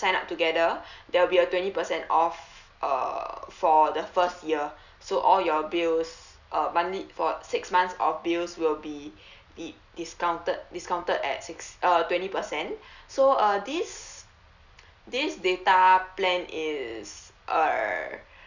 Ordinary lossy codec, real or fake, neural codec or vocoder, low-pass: none; real; none; 7.2 kHz